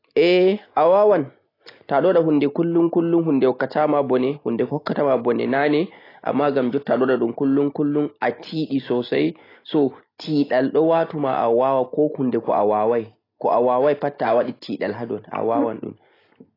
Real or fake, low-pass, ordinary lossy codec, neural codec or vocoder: real; 5.4 kHz; AAC, 24 kbps; none